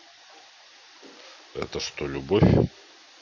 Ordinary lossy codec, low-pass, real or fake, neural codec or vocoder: none; 7.2 kHz; real; none